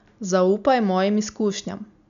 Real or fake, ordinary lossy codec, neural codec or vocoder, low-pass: real; none; none; 7.2 kHz